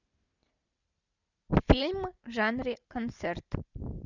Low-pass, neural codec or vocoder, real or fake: 7.2 kHz; none; real